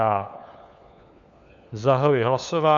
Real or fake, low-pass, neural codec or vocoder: fake; 7.2 kHz; codec, 16 kHz, 4 kbps, FunCodec, trained on LibriTTS, 50 frames a second